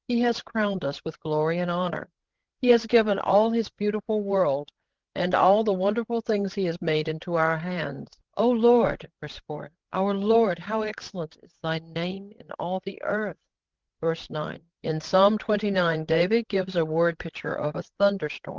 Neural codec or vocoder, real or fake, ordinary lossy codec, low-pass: codec, 16 kHz, 16 kbps, FreqCodec, larger model; fake; Opus, 16 kbps; 7.2 kHz